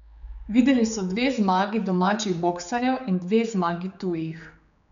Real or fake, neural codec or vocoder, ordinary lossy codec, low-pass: fake; codec, 16 kHz, 4 kbps, X-Codec, HuBERT features, trained on general audio; MP3, 96 kbps; 7.2 kHz